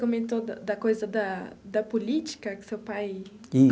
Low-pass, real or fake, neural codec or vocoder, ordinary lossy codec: none; real; none; none